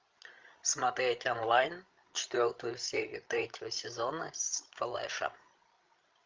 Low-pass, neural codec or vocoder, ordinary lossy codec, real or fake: 7.2 kHz; codec, 16 kHz, 16 kbps, FreqCodec, larger model; Opus, 32 kbps; fake